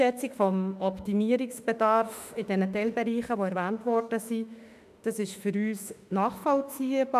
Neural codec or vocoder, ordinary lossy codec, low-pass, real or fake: autoencoder, 48 kHz, 32 numbers a frame, DAC-VAE, trained on Japanese speech; none; 14.4 kHz; fake